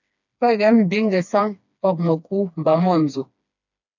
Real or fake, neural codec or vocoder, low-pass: fake; codec, 16 kHz, 2 kbps, FreqCodec, smaller model; 7.2 kHz